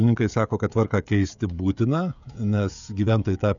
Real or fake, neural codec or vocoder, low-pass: fake; codec, 16 kHz, 16 kbps, FreqCodec, smaller model; 7.2 kHz